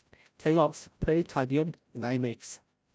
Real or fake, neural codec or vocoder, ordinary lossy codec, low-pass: fake; codec, 16 kHz, 0.5 kbps, FreqCodec, larger model; none; none